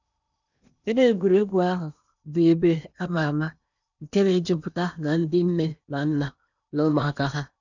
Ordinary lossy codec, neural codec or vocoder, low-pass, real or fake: none; codec, 16 kHz in and 24 kHz out, 0.8 kbps, FocalCodec, streaming, 65536 codes; 7.2 kHz; fake